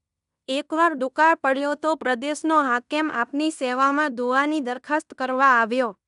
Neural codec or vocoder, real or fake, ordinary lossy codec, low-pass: codec, 16 kHz in and 24 kHz out, 0.9 kbps, LongCat-Audio-Codec, fine tuned four codebook decoder; fake; none; 10.8 kHz